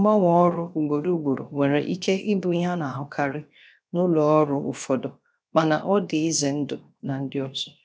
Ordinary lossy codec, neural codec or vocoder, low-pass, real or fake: none; codec, 16 kHz, 0.7 kbps, FocalCodec; none; fake